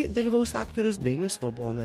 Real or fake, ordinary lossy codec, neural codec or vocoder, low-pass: fake; MP3, 64 kbps; codec, 44.1 kHz, 2.6 kbps, DAC; 19.8 kHz